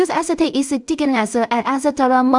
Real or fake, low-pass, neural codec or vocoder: fake; 10.8 kHz; codec, 16 kHz in and 24 kHz out, 0.4 kbps, LongCat-Audio-Codec, two codebook decoder